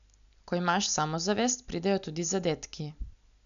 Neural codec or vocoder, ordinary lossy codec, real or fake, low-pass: none; none; real; 7.2 kHz